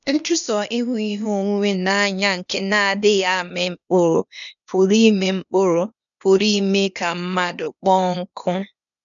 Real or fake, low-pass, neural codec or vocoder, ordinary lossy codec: fake; 7.2 kHz; codec, 16 kHz, 0.8 kbps, ZipCodec; none